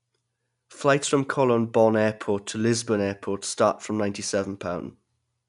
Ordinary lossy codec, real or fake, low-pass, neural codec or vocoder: none; real; 10.8 kHz; none